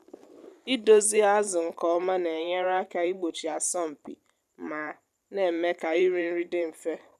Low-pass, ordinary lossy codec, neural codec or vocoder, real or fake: 14.4 kHz; none; vocoder, 44.1 kHz, 128 mel bands every 512 samples, BigVGAN v2; fake